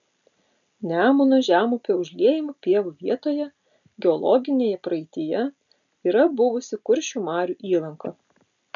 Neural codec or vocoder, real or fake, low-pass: none; real; 7.2 kHz